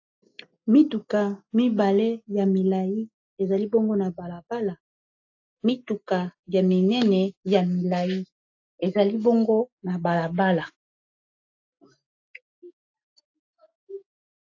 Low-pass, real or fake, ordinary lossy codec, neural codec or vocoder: 7.2 kHz; real; AAC, 48 kbps; none